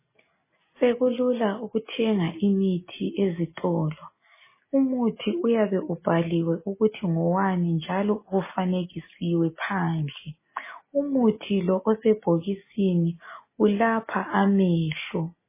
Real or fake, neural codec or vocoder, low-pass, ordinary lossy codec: real; none; 3.6 kHz; MP3, 16 kbps